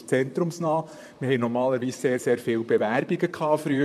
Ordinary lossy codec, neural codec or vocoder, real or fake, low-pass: MP3, 96 kbps; vocoder, 44.1 kHz, 128 mel bands, Pupu-Vocoder; fake; 14.4 kHz